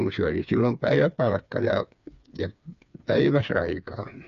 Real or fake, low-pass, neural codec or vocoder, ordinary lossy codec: fake; 7.2 kHz; codec, 16 kHz, 4 kbps, FreqCodec, smaller model; none